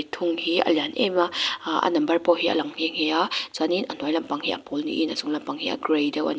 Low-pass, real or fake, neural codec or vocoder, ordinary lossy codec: none; real; none; none